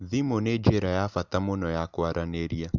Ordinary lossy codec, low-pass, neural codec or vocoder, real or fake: none; 7.2 kHz; none; real